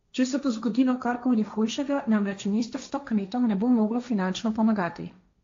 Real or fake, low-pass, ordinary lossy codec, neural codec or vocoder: fake; 7.2 kHz; AAC, 48 kbps; codec, 16 kHz, 1.1 kbps, Voila-Tokenizer